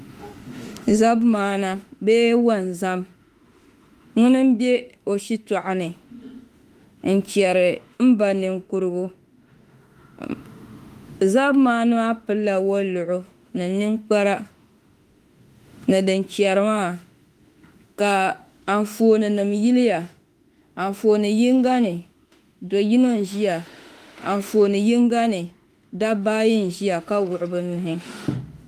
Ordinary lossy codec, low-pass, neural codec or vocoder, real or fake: Opus, 32 kbps; 14.4 kHz; autoencoder, 48 kHz, 32 numbers a frame, DAC-VAE, trained on Japanese speech; fake